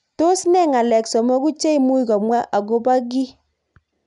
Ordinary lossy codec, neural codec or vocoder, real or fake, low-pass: none; none; real; 10.8 kHz